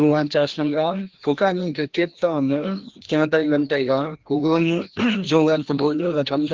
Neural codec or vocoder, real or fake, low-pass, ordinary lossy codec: codec, 16 kHz, 1 kbps, FreqCodec, larger model; fake; 7.2 kHz; Opus, 16 kbps